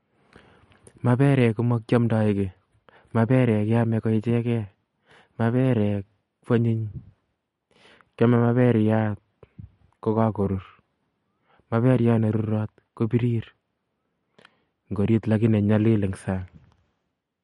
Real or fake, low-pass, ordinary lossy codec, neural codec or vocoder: real; 19.8 kHz; MP3, 48 kbps; none